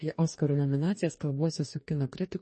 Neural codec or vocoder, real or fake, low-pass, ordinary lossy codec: codec, 44.1 kHz, 2.6 kbps, DAC; fake; 10.8 kHz; MP3, 32 kbps